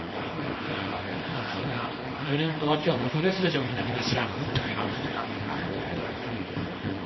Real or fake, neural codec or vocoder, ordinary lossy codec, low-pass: fake; codec, 24 kHz, 0.9 kbps, WavTokenizer, small release; MP3, 24 kbps; 7.2 kHz